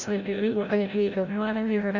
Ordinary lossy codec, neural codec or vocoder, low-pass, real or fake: none; codec, 16 kHz, 0.5 kbps, FreqCodec, larger model; 7.2 kHz; fake